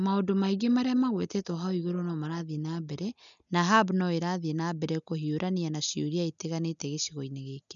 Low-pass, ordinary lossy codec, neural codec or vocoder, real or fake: 7.2 kHz; none; none; real